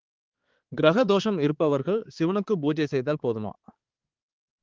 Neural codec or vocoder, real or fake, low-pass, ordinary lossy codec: codec, 16 kHz, 4 kbps, X-Codec, HuBERT features, trained on balanced general audio; fake; 7.2 kHz; Opus, 16 kbps